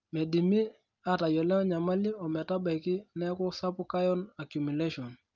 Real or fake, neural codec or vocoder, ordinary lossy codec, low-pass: real; none; Opus, 24 kbps; 7.2 kHz